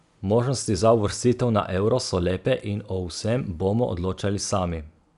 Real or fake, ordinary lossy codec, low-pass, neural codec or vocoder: real; none; 10.8 kHz; none